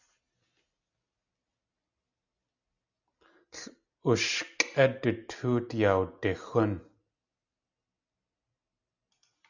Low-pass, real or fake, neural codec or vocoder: 7.2 kHz; real; none